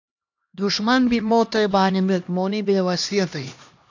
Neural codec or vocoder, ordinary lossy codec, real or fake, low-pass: codec, 16 kHz, 1 kbps, X-Codec, HuBERT features, trained on LibriSpeech; AAC, 48 kbps; fake; 7.2 kHz